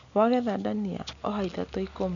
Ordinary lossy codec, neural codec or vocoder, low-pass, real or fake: none; none; 7.2 kHz; real